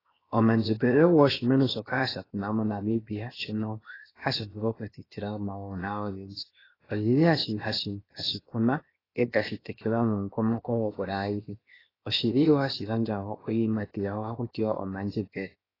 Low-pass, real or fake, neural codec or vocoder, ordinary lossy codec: 5.4 kHz; fake; codec, 16 kHz, 0.7 kbps, FocalCodec; AAC, 24 kbps